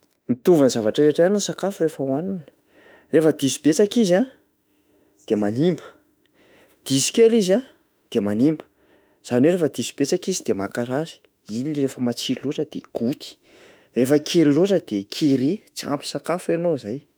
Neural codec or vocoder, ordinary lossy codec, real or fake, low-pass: autoencoder, 48 kHz, 32 numbers a frame, DAC-VAE, trained on Japanese speech; none; fake; none